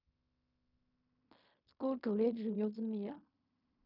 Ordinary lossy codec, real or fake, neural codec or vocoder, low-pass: none; fake; codec, 16 kHz in and 24 kHz out, 0.4 kbps, LongCat-Audio-Codec, fine tuned four codebook decoder; 5.4 kHz